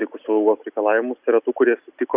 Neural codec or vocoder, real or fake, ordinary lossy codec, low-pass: none; real; Opus, 64 kbps; 3.6 kHz